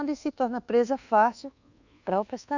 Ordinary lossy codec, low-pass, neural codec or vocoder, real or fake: none; 7.2 kHz; codec, 24 kHz, 1.2 kbps, DualCodec; fake